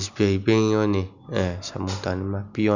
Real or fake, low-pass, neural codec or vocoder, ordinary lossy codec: real; 7.2 kHz; none; none